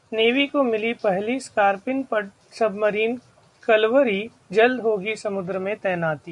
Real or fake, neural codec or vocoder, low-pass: real; none; 10.8 kHz